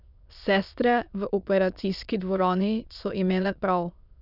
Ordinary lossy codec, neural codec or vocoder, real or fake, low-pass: none; autoencoder, 22.05 kHz, a latent of 192 numbers a frame, VITS, trained on many speakers; fake; 5.4 kHz